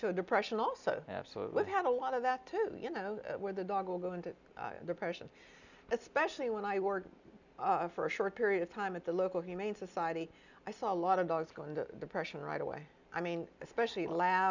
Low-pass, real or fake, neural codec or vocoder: 7.2 kHz; real; none